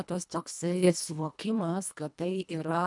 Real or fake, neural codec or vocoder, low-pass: fake; codec, 24 kHz, 1.5 kbps, HILCodec; 10.8 kHz